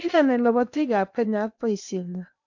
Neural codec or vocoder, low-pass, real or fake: codec, 16 kHz in and 24 kHz out, 0.8 kbps, FocalCodec, streaming, 65536 codes; 7.2 kHz; fake